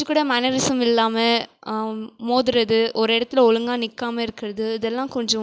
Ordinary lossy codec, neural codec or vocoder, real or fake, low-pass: none; none; real; none